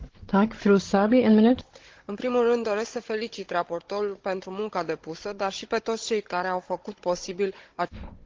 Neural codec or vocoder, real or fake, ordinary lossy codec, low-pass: codec, 16 kHz, 16 kbps, FunCodec, trained on Chinese and English, 50 frames a second; fake; Opus, 16 kbps; 7.2 kHz